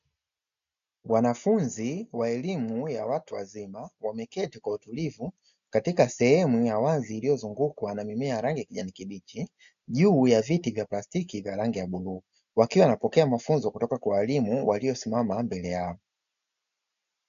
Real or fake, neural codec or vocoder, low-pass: real; none; 7.2 kHz